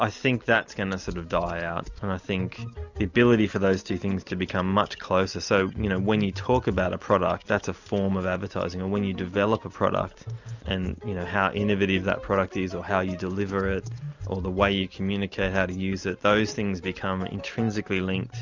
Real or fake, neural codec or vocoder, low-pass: real; none; 7.2 kHz